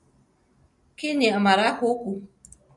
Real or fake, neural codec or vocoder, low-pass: real; none; 10.8 kHz